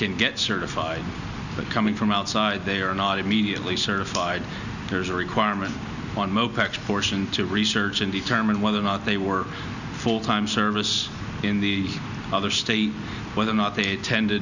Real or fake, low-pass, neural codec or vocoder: real; 7.2 kHz; none